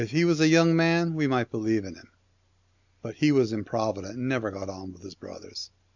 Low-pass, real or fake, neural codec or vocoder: 7.2 kHz; real; none